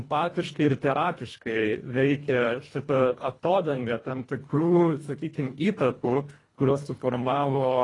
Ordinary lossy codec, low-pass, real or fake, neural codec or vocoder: AAC, 32 kbps; 10.8 kHz; fake; codec, 24 kHz, 1.5 kbps, HILCodec